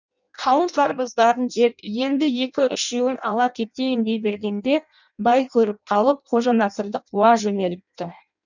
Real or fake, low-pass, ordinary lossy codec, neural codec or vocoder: fake; 7.2 kHz; none; codec, 16 kHz in and 24 kHz out, 0.6 kbps, FireRedTTS-2 codec